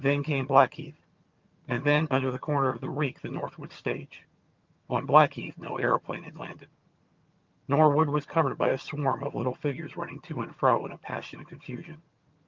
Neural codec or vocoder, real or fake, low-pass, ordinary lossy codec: vocoder, 22.05 kHz, 80 mel bands, HiFi-GAN; fake; 7.2 kHz; Opus, 24 kbps